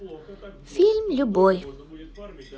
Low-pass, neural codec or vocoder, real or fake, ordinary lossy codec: none; none; real; none